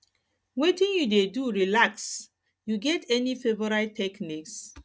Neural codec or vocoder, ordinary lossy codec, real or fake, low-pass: none; none; real; none